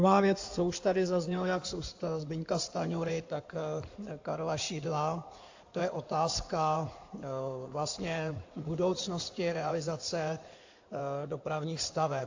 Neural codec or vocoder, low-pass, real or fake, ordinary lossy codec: codec, 16 kHz in and 24 kHz out, 2.2 kbps, FireRedTTS-2 codec; 7.2 kHz; fake; AAC, 48 kbps